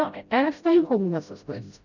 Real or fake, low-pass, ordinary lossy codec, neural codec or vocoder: fake; 7.2 kHz; none; codec, 16 kHz, 0.5 kbps, FreqCodec, smaller model